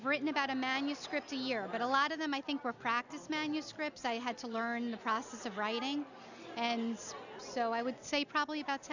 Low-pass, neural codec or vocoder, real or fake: 7.2 kHz; none; real